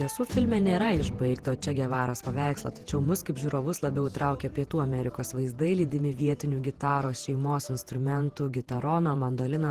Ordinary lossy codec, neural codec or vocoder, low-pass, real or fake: Opus, 16 kbps; vocoder, 48 kHz, 128 mel bands, Vocos; 14.4 kHz; fake